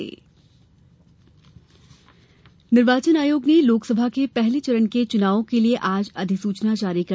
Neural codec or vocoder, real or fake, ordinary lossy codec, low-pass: none; real; none; none